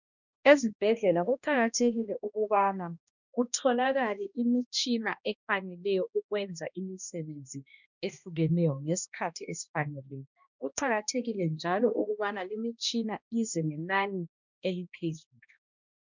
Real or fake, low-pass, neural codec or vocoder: fake; 7.2 kHz; codec, 16 kHz, 1 kbps, X-Codec, HuBERT features, trained on balanced general audio